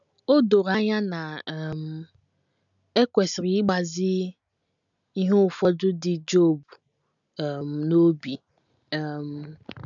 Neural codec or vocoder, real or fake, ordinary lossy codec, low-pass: none; real; none; 7.2 kHz